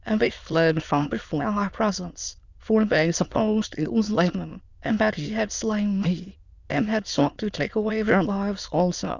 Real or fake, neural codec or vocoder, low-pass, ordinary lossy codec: fake; autoencoder, 22.05 kHz, a latent of 192 numbers a frame, VITS, trained on many speakers; 7.2 kHz; Opus, 64 kbps